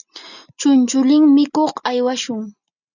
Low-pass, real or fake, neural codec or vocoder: 7.2 kHz; real; none